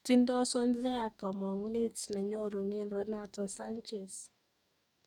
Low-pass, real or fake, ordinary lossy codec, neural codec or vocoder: 19.8 kHz; fake; none; codec, 44.1 kHz, 2.6 kbps, DAC